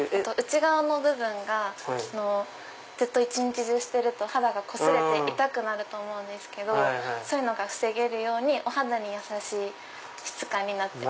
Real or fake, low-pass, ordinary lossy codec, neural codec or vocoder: real; none; none; none